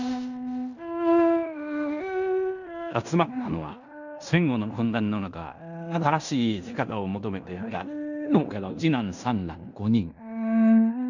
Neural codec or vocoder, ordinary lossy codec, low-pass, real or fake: codec, 16 kHz in and 24 kHz out, 0.9 kbps, LongCat-Audio-Codec, fine tuned four codebook decoder; none; 7.2 kHz; fake